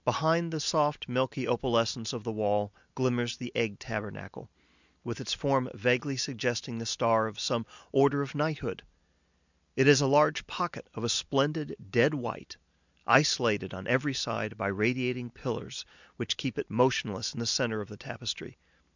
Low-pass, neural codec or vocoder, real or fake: 7.2 kHz; none; real